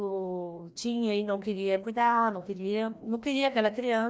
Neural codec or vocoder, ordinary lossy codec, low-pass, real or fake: codec, 16 kHz, 1 kbps, FreqCodec, larger model; none; none; fake